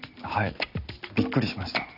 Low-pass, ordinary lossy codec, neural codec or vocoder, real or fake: 5.4 kHz; none; none; real